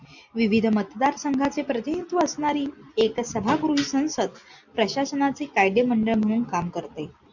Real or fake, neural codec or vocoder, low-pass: real; none; 7.2 kHz